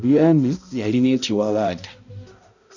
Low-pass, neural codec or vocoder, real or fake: 7.2 kHz; codec, 16 kHz, 0.5 kbps, X-Codec, HuBERT features, trained on balanced general audio; fake